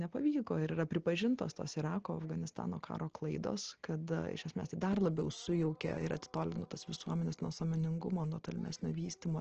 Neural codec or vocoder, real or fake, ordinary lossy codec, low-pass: none; real; Opus, 16 kbps; 7.2 kHz